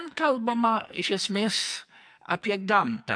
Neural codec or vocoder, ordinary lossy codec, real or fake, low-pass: codec, 44.1 kHz, 2.6 kbps, SNAC; AAC, 64 kbps; fake; 9.9 kHz